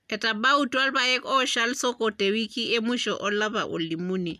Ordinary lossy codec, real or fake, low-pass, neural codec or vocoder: none; real; 14.4 kHz; none